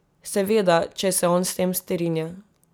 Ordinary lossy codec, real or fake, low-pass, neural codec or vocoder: none; real; none; none